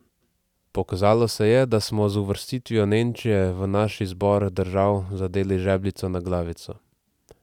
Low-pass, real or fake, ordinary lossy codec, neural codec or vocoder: 19.8 kHz; real; none; none